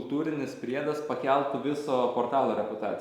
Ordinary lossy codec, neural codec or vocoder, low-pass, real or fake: Opus, 64 kbps; none; 19.8 kHz; real